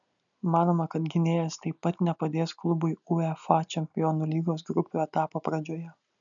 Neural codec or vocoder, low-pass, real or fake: none; 7.2 kHz; real